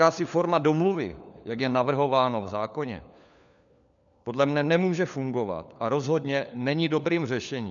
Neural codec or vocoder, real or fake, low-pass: codec, 16 kHz, 4 kbps, FunCodec, trained on LibriTTS, 50 frames a second; fake; 7.2 kHz